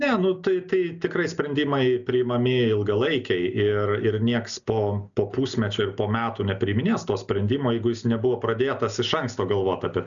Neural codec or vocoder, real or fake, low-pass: none; real; 7.2 kHz